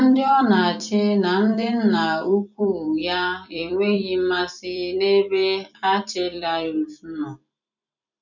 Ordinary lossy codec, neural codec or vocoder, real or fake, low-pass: none; vocoder, 44.1 kHz, 128 mel bands every 256 samples, BigVGAN v2; fake; 7.2 kHz